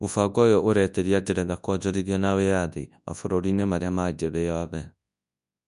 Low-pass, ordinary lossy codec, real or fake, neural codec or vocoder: 10.8 kHz; none; fake; codec, 24 kHz, 0.9 kbps, WavTokenizer, large speech release